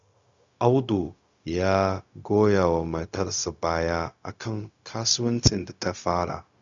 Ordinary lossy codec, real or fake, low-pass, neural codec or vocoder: Opus, 64 kbps; fake; 7.2 kHz; codec, 16 kHz, 0.4 kbps, LongCat-Audio-Codec